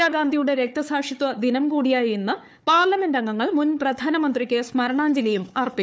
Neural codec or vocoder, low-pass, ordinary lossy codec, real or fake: codec, 16 kHz, 4 kbps, FunCodec, trained on Chinese and English, 50 frames a second; none; none; fake